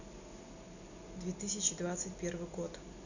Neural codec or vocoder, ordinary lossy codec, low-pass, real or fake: none; none; 7.2 kHz; real